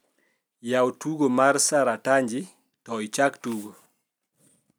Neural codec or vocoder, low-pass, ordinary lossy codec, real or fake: none; none; none; real